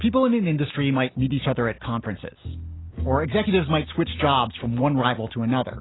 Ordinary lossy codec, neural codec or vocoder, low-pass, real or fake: AAC, 16 kbps; none; 7.2 kHz; real